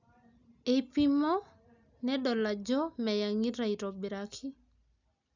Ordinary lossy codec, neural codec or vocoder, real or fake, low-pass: none; none; real; 7.2 kHz